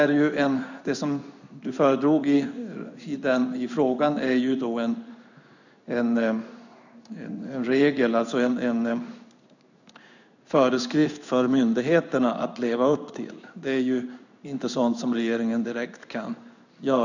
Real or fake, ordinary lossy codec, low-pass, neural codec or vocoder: fake; none; 7.2 kHz; codec, 16 kHz in and 24 kHz out, 1 kbps, XY-Tokenizer